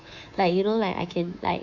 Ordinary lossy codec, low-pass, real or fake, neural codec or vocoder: none; 7.2 kHz; fake; codec, 24 kHz, 3.1 kbps, DualCodec